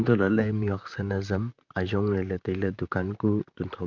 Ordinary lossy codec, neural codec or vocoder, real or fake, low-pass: none; vocoder, 44.1 kHz, 128 mel bands, Pupu-Vocoder; fake; 7.2 kHz